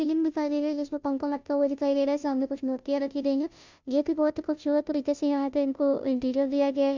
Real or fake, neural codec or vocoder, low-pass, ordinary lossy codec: fake; codec, 16 kHz, 0.5 kbps, FunCodec, trained on Chinese and English, 25 frames a second; 7.2 kHz; MP3, 64 kbps